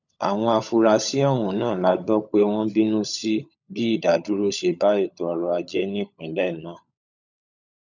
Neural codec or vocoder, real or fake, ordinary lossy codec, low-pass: codec, 16 kHz, 16 kbps, FunCodec, trained on LibriTTS, 50 frames a second; fake; none; 7.2 kHz